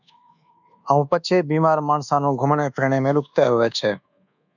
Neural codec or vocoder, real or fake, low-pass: codec, 24 kHz, 1.2 kbps, DualCodec; fake; 7.2 kHz